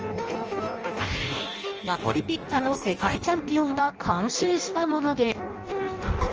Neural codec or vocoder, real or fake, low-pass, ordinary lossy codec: codec, 16 kHz in and 24 kHz out, 0.6 kbps, FireRedTTS-2 codec; fake; 7.2 kHz; Opus, 24 kbps